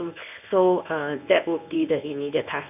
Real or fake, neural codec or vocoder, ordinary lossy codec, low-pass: fake; codec, 24 kHz, 0.9 kbps, WavTokenizer, medium speech release version 1; none; 3.6 kHz